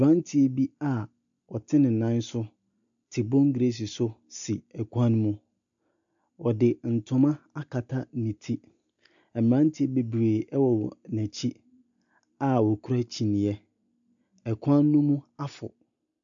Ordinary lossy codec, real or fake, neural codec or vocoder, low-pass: MP3, 96 kbps; real; none; 7.2 kHz